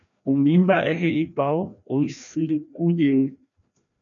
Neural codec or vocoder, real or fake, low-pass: codec, 16 kHz, 1 kbps, FreqCodec, larger model; fake; 7.2 kHz